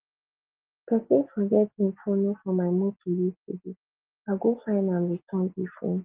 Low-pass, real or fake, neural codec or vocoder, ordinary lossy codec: 3.6 kHz; real; none; Opus, 16 kbps